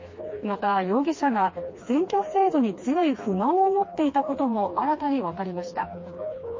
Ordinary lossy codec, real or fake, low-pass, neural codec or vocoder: MP3, 32 kbps; fake; 7.2 kHz; codec, 16 kHz, 2 kbps, FreqCodec, smaller model